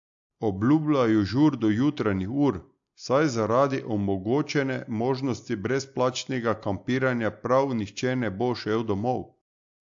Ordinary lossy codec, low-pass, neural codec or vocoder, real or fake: AAC, 64 kbps; 7.2 kHz; none; real